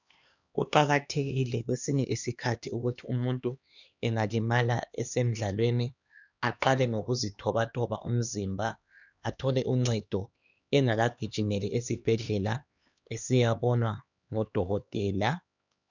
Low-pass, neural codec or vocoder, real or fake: 7.2 kHz; codec, 16 kHz, 2 kbps, X-Codec, HuBERT features, trained on LibriSpeech; fake